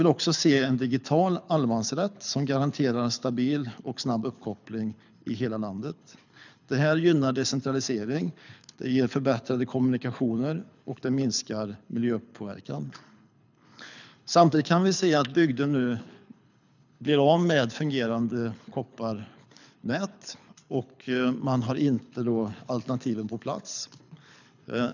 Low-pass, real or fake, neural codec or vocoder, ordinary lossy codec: 7.2 kHz; fake; codec, 24 kHz, 6 kbps, HILCodec; none